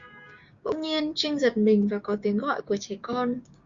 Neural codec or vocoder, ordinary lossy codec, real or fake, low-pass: codec, 16 kHz, 6 kbps, DAC; Opus, 64 kbps; fake; 7.2 kHz